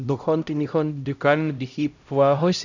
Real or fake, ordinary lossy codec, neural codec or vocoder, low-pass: fake; none; codec, 16 kHz, 0.5 kbps, X-Codec, HuBERT features, trained on LibriSpeech; 7.2 kHz